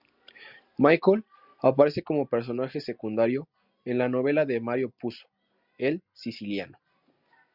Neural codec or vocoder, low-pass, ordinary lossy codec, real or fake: none; 5.4 kHz; Opus, 64 kbps; real